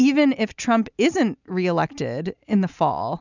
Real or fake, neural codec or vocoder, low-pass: real; none; 7.2 kHz